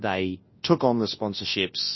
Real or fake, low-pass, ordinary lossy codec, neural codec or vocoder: fake; 7.2 kHz; MP3, 24 kbps; codec, 24 kHz, 0.9 kbps, WavTokenizer, large speech release